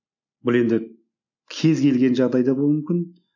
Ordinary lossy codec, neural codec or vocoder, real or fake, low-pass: none; none; real; 7.2 kHz